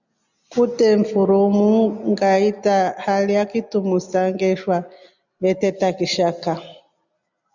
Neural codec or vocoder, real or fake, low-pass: none; real; 7.2 kHz